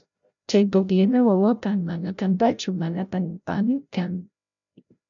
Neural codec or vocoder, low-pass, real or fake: codec, 16 kHz, 0.5 kbps, FreqCodec, larger model; 7.2 kHz; fake